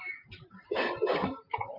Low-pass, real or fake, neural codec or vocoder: 5.4 kHz; fake; vocoder, 44.1 kHz, 128 mel bands, Pupu-Vocoder